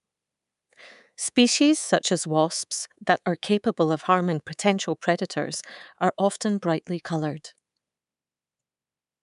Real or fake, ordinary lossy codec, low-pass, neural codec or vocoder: fake; none; 10.8 kHz; codec, 24 kHz, 3.1 kbps, DualCodec